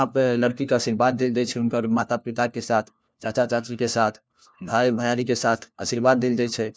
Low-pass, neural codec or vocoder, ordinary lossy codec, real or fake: none; codec, 16 kHz, 1 kbps, FunCodec, trained on LibriTTS, 50 frames a second; none; fake